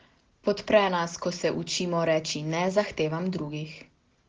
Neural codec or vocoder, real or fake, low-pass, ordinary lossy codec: none; real; 7.2 kHz; Opus, 16 kbps